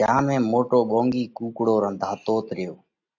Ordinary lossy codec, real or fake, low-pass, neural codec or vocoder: AAC, 48 kbps; real; 7.2 kHz; none